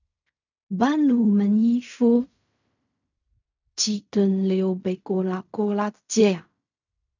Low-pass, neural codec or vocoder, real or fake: 7.2 kHz; codec, 16 kHz in and 24 kHz out, 0.4 kbps, LongCat-Audio-Codec, fine tuned four codebook decoder; fake